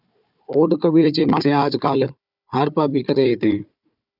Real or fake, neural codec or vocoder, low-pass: fake; codec, 16 kHz, 4 kbps, FunCodec, trained on Chinese and English, 50 frames a second; 5.4 kHz